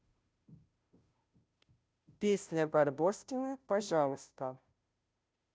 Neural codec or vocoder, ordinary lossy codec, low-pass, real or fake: codec, 16 kHz, 0.5 kbps, FunCodec, trained on Chinese and English, 25 frames a second; none; none; fake